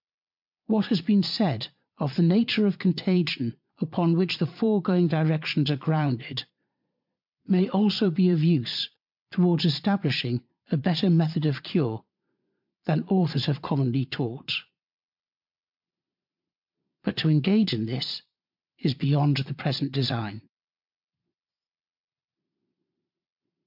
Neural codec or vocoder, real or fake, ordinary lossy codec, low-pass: vocoder, 22.05 kHz, 80 mel bands, Vocos; fake; MP3, 48 kbps; 5.4 kHz